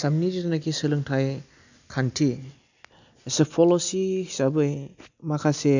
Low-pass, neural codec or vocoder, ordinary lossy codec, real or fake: 7.2 kHz; none; none; real